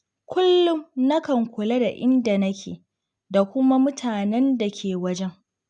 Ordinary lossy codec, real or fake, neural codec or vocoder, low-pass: none; real; none; 9.9 kHz